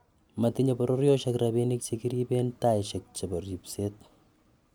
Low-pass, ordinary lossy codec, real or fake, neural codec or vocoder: none; none; real; none